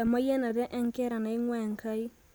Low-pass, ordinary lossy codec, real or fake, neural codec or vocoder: none; none; real; none